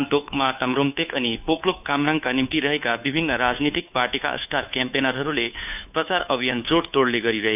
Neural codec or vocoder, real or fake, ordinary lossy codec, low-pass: codec, 16 kHz, 2 kbps, FunCodec, trained on Chinese and English, 25 frames a second; fake; none; 3.6 kHz